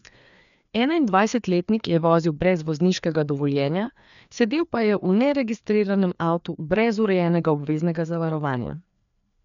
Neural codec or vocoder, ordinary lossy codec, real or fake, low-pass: codec, 16 kHz, 2 kbps, FreqCodec, larger model; none; fake; 7.2 kHz